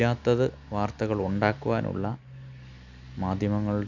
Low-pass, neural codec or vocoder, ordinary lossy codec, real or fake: 7.2 kHz; none; none; real